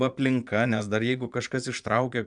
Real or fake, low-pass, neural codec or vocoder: fake; 9.9 kHz; vocoder, 22.05 kHz, 80 mel bands, Vocos